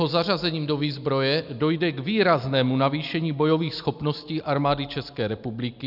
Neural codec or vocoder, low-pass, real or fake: none; 5.4 kHz; real